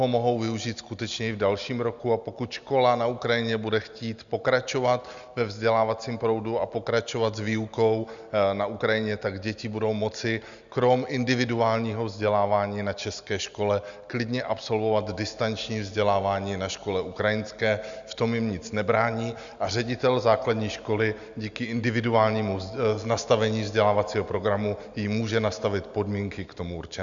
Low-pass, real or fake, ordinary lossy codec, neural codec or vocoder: 7.2 kHz; real; Opus, 64 kbps; none